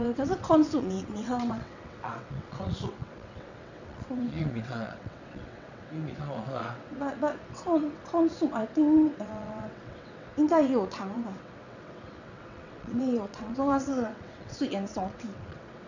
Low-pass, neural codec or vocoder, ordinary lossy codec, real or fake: 7.2 kHz; vocoder, 22.05 kHz, 80 mel bands, WaveNeXt; none; fake